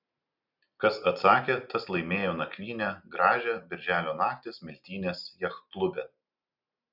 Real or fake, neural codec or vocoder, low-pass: real; none; 5.4 kHz